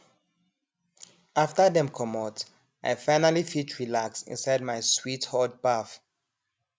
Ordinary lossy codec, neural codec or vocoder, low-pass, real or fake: none; none; none; real